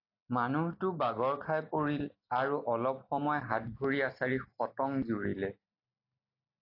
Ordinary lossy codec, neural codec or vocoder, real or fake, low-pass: AAC, 32 kbps; none; real; 5.4 kHz